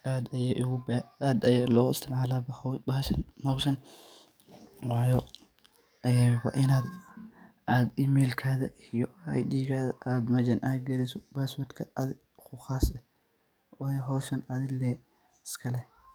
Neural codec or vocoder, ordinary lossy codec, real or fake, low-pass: codec, 44.1 kHz, 7.8 kbps, DAC; none; fake; none